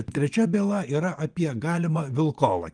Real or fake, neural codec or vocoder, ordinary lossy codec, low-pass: fake; vocoder, 24 kHz, 100 mel bands, Vocos; Opus, 32 kbps; 9.9 kHz